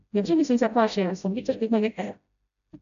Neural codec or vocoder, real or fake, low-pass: codec, 16 kHz, 0.5 kbps, FreqCodec, smaller model; fake; 7.2 kHz